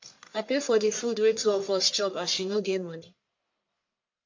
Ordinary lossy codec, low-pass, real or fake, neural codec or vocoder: MP3, 48 kbps; 7.2 kHz; fake; codec, 44.1 kHz, 1.7 kbps, Pupu-Codec